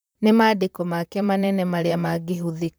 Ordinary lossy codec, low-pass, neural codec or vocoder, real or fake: none; none; vocoder, 44.1 kHz, 128 mel bands, Pupu-Vocoder; fake